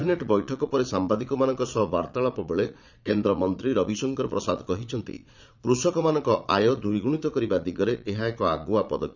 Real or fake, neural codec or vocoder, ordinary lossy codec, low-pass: fake; vocoder, 22.05 kHz, 80 mel bands, Vocos; none; 7.2 kHz